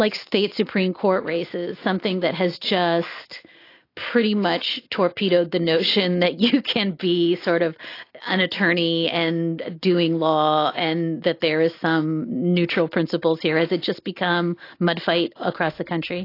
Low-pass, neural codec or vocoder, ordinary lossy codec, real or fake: 5.4 kHz; none; AAC, 32 kbps; real